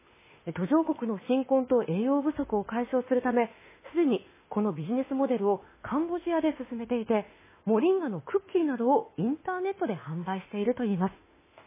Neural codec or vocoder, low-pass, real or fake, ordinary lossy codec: autoencoder, 48 kHz, 32 numbers a frame, DAC-VAE, trained on Japanese speech; 3.6 kHz; fake; MP3, 16 kbps